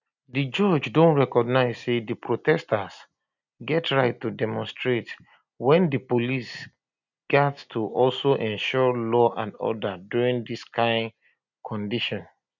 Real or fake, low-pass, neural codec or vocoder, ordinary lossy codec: real; 7.2 kHz; none; none